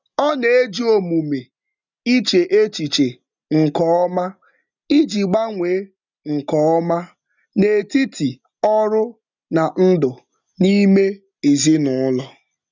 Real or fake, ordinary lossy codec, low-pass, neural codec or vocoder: real; none; 7.2 kHz; none